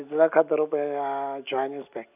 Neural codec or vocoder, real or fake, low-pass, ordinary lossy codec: none; real; 3.6 kHz; none